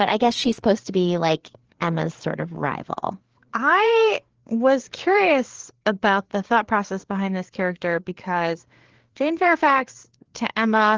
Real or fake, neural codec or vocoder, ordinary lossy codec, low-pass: fake; codec, 16 kHz, 4 kbps, FreqCodec, larger model; Opus, 16 kbps; 7.2 kHz